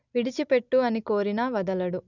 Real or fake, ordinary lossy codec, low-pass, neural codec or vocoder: real; none; 7.2 kHz; none